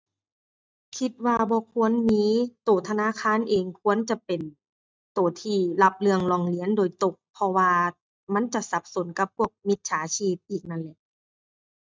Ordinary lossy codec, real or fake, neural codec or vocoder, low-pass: none; real; none; none